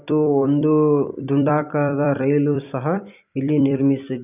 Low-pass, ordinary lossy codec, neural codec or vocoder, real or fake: 3.6 kHz; none; vocoder, 44.1 kHz, 128 mel bands every 256 samples, BigVGAN v2; fake